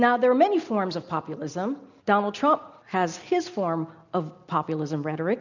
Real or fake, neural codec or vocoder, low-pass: real; none; 7.2 kHz